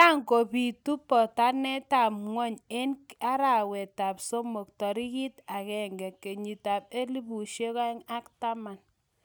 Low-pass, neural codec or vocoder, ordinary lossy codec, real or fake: none; none; none; real